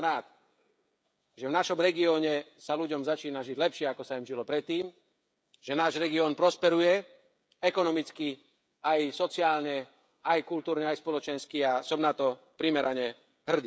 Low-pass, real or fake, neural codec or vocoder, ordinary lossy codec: none; fake; codec, 16 kHz, 16 kbps, FreqCodec, smaller model; none